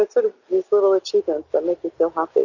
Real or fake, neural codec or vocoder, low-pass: real; none; 7.2 kHz